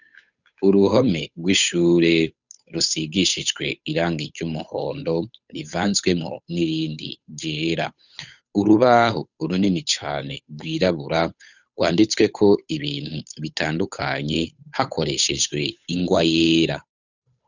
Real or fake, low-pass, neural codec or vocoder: fake; 7.2 kHz; codec, 16 kHz, 8 kbps, FunCodec, trained on Chinese and English, 25 frames a second